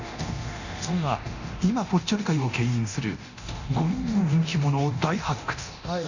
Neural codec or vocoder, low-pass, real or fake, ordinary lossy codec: codec, 24 kHz, 0.9 kbps, DualCodec; 7.2 kHz; fake; none